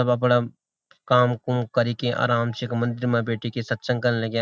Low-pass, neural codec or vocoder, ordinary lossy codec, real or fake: none; none; none; real